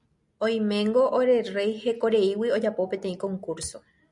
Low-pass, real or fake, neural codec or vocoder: 10.8 kHz; real; none